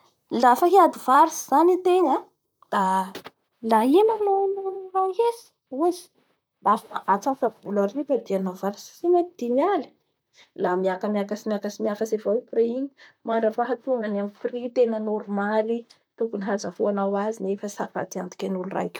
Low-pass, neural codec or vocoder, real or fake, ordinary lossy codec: none; vocoder, 44.1 kHz, 128 mel bands, Pupu-Vocoder; fake; none